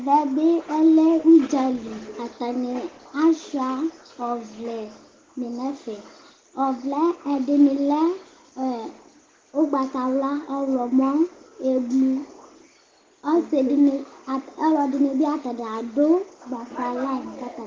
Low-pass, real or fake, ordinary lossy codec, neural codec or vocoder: 7.2 kHz; real; Opus, 16 kbps; none